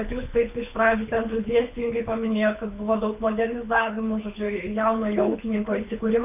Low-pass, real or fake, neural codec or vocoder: 3.6 kHz; fake; codec, 24 kHz, 6 kbps, HILCodec